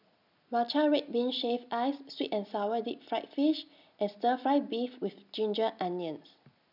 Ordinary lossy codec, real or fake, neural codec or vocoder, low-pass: none; real; none; 5.4 kHz